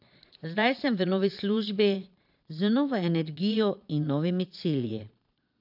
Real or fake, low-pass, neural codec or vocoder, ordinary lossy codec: fake; 5.4 kHz; vocoder, 22.05 kHz, 80 mel bands, Vocos; AAC, 48 kbps